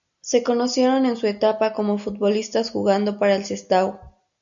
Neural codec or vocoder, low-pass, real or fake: none; 7.2 kHz; real